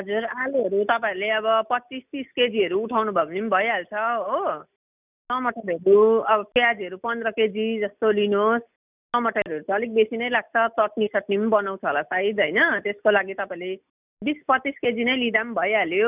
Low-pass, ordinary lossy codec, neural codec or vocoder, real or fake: 3.6 kHz; none; none; real